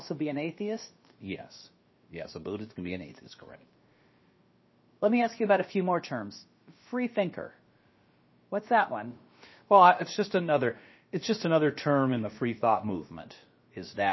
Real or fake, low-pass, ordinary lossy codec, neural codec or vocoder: fake; 7.2 kHz; MP3, 24 kbps; codec, 16 kHz, about 1 kbps, DyCAST, with the encoder's durations